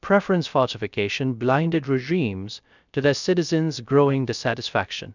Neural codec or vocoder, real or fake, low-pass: codec, 16 kHz, 0.3 kbps, FocalCodec; fake; 7.2 kHz